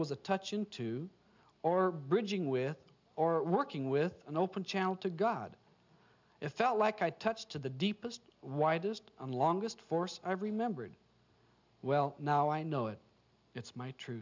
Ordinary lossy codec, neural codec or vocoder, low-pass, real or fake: MP3, 64 kbps; none; 7.2 kHz; real